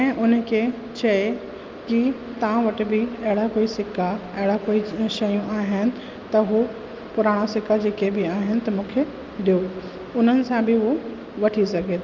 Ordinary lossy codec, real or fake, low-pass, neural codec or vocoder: Opus, 24 kbps; real; 7.2 kHz; none